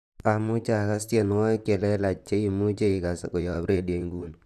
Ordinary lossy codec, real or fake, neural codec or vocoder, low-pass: none; fake; vocoder, 44.1 kHz, 128 mel bands, Pupu-Vocoder; 14.4 kHz